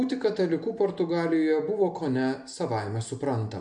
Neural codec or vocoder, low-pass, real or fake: none; 10.8 kHz; real